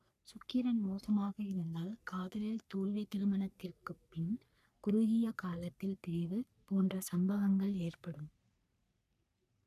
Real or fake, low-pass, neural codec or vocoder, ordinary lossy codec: fake; 14.4 kHz; codec, 44.1 kHz, 3.4 kbps, Pupu-Codec; none